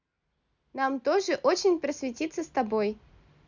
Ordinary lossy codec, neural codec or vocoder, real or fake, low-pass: none; none; real; 7.2 kHz